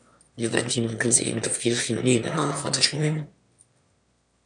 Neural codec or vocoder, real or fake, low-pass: autoencoder, 22.05 kHz, a latent of 192 numbers a frame, VITS, trained on one speaker; fake; 9.9 kHz